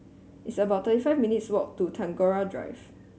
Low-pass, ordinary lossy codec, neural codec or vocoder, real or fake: none; none; none; real